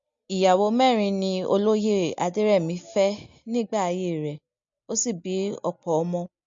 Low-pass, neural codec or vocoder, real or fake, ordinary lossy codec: 7.2 kHz; none; real; MP3, 48 kbps